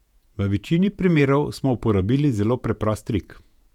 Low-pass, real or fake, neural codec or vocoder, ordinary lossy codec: 19.8 kHz; fake; vocoder, 48 kHz, 128 mel bands, Vocos; none